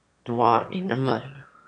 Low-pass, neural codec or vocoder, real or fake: 9.9 kHz; autoencoder, 22.05 kHz, a latent of 192 numbers a frame, VITS, trained on one speaker; fake